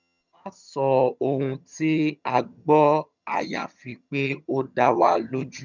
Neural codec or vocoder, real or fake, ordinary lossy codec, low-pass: vocoder, 22.05 kHz, 80 mel bands, HiFi-GAN; fake; none; 7.2 kHz